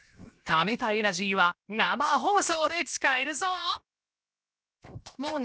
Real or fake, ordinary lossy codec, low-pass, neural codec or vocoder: fake; none; none; codec, 16 kHz, 0.7 kbps, FocalCodec